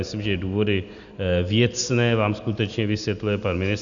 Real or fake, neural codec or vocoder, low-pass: real; none; 7.2 kHz